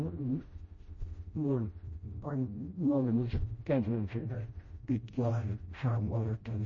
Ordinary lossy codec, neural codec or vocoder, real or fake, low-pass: MP3, 32 kbps; codec, 16 kHz, 0.5 kbps, FreqCodec, smaller model; fake; 7.2 kHz